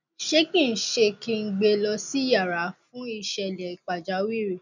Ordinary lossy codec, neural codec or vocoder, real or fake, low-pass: none; none; real; 7.2 kHz